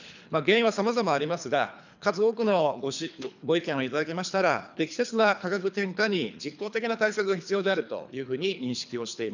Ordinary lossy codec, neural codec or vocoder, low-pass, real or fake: none; codec, 24 kHz, 3 kbps, HILCodec; 7.2 kHz; fake